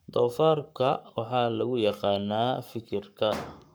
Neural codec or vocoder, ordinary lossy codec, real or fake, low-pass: codec, 44.1 kHz, 7.8 kbps, Pupu-Codec; none; fake; none